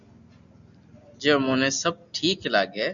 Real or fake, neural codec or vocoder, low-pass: real; none; 7.2 kHz